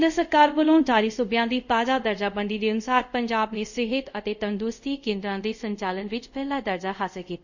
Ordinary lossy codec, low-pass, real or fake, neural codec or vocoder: none; 7.2 kHz; fake; codec, 24 kHz, 0.5 kbps, DualCodec